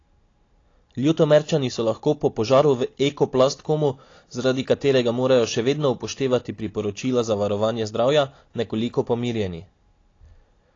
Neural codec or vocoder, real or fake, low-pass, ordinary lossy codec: none; real; 7.2 kHz; AAC, 32 kbps